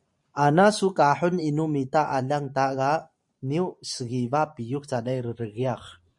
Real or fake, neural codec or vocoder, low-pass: fake; vocoder, 44.1 kHz, 128 mel bands every 512 samples, BigVGAN v2; 10.8 kHz